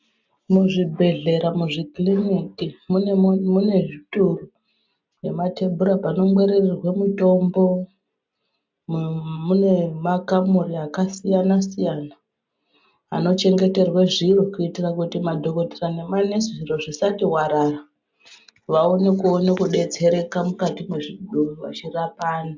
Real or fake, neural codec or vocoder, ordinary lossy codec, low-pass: real; none; MP3, 64 kbps; 7.2 kHz